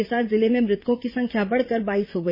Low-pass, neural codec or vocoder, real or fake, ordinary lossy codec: 5.4 kHz; vocoder, 44.1 kHz, 80 mel bands, Vocos; fake; MP3, 24 kbps